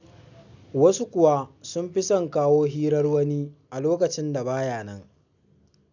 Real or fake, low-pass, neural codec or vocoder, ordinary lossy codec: real; 7.2 kHz; none; none